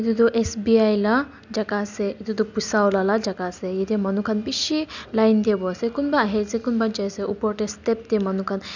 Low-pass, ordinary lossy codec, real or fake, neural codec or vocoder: 7.2 kHz; none; real; none